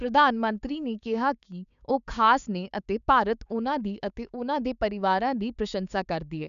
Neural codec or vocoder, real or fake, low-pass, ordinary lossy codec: codec, 16 kHz, 6 kbps, DAC; fake; 7.2 kHz; none